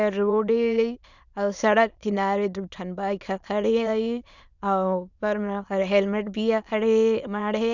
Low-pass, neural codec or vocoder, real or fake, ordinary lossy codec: 7.2 kHz; autoencoder, 22.05 kHz, a latent of 192 numbers a frame, VITS, trained on many speakers; fake; none